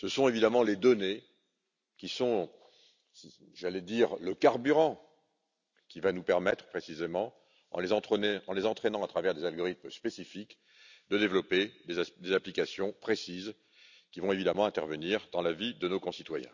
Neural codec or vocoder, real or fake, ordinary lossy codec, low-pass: none; real; none; 7.2 kHz